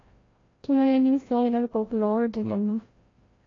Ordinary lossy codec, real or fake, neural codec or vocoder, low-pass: AAC, 32 kbps; fake; codec, 16 kHz, 0.5 kbps, FreqCodec, larger model; 7.2 kHz